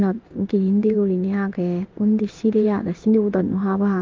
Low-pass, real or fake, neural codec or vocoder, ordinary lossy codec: 7.2 kHz; fake; codec, 16 kHz in and 24 kHz out, 1 kbps, XY-Tokenizer; Opus, 24 kbps